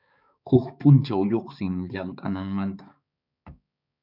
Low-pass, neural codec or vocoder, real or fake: 5.4 kHz; codec, 16 kHz, 4 kbps, X-Codec, HuBERT features, trained on balanced general audio; fake